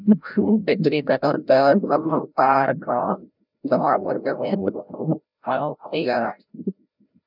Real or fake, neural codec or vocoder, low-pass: fake; codec, 16 kHz, 0.5 kbps, FreqCodec, larger model; 5.4 kHz